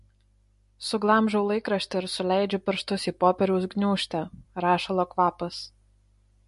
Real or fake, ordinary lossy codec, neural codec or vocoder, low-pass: real; MP3, 48 kbps; none; 14.4 kHz